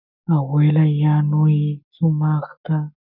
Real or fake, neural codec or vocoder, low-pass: fake; codec, 44.1 kHz, 7.8 kbps, Pupu-Codec; 5.4 kHz